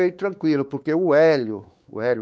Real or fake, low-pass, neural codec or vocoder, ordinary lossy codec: fake; none; codec, 16 kHz, 4 kbps, X-Codec, WavLM features, trained on Multilingual LibriSpeech; none